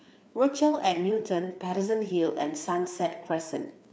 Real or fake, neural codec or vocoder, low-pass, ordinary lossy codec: fake; codec, 16 kHz, 4 kbps, FreqCodec, larger model; none; none